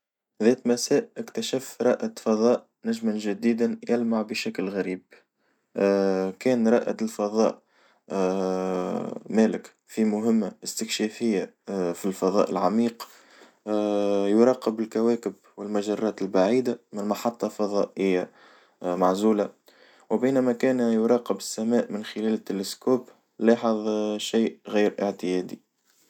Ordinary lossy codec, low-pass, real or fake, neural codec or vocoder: none; 19.8 kHz; real; none